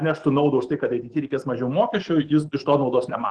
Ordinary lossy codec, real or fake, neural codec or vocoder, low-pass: Opus, 16 kbps; fake; autoencoder, 48 kHz, 128 numbers a frame, DAC-VAE, trained on Japanese speech; 10.8 kHz